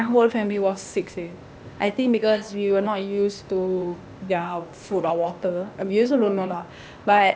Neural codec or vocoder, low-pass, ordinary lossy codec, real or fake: codec, 16 kHz, 0.8 kbps, ZipCodec; none; none; fake